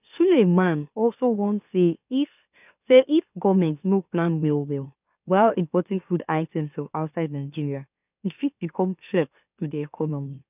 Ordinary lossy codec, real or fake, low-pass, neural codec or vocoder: none; fake; 3.6 kHz; autoencoder, 44.1 kHz, a latent of 192 numbers a frame, MeloTTS